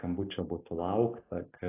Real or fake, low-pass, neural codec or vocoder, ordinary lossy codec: real; 3.6 kHz; none; AAC, 16 kbps